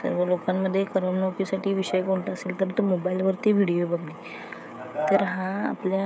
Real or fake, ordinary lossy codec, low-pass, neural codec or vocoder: fake; none; none; codec, 16 kHz, 16 kbps, FreqCodec, smaller model